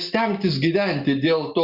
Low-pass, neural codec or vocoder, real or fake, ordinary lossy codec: 5.4 kHz; none; real; Opus, 64 kbps